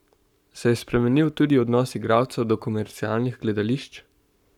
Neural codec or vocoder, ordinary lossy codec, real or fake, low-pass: codec, 44.1 kHz, 7.8 kbps, Pupu-Codec; none; fake; 19.8 kHz